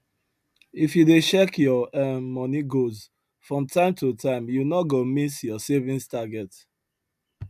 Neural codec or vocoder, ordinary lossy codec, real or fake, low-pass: none; none; real; 14.4 kHz